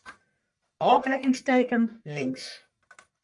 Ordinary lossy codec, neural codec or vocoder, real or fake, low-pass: MP3, 64 kbps; codec, 44.1 kHz, 1.7 kbps, Pupu-Codec; fake; 10.8 kHz